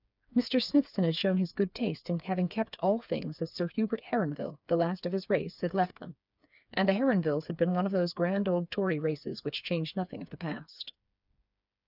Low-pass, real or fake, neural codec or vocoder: 5.4 kHz; fake; codec, 16 kHz, 4 kbps, FreqCodec, smaller model